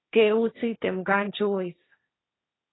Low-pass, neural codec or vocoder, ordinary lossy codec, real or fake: 7.2 kHz; codec, 16 kHz, 1.1 kbps, Voila-Tokenizer; AAC, 16 kbps; fake